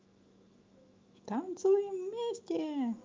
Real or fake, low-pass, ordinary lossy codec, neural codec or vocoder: fake; 7.2 kHz; Opus, 24 kbps; autoencoder, 48 kHz, 128 numbers a frame, DAC-VAE, trained on Japanese speech